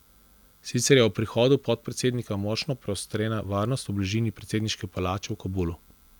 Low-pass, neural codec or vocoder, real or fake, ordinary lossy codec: none; none; real; none